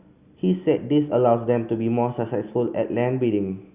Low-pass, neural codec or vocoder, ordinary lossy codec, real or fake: 3.6 kHz; none; Opus, 64 kbps; real